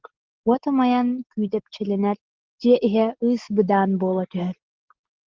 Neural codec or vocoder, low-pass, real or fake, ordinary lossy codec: none; 7.2 kHz; real; Opus, 16 kbps